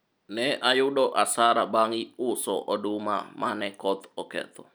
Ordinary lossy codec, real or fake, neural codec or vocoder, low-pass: none; real; none; none